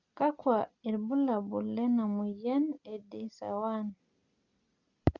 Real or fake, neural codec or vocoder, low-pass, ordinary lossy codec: real; none; 7.2 kHz; Opus, 64 kbps